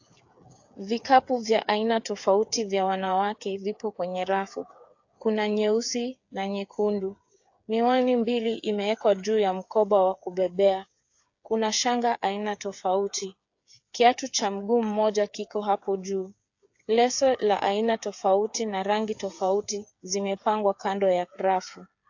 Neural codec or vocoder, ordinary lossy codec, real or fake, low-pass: codec, 16 kHz, 8 kbps, FreqCodec, smaller model; AAC, 48 kbps; fake; 7.2 kHz